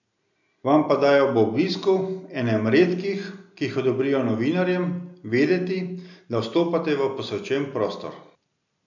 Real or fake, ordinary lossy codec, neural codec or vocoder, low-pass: real; none; none; 7.2 kHz